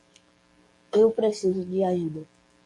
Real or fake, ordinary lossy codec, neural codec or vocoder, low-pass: fake; MP3, 48 kbps; codec, 44.1 kHz, 7.8 kbps, DAC; 10.8 kHz